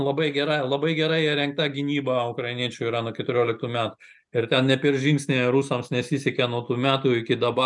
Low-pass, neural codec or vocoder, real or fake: 10.8 kHz; none; real